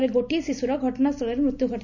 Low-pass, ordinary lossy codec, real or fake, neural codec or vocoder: 7.2 kHz; none; real; none